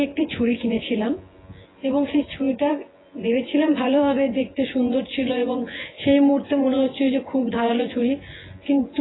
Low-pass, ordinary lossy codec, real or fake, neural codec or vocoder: 7.2 kHz; AAC, 16 kbps; fake; vocoder, 24 kHz, 100 mel bands, Vocos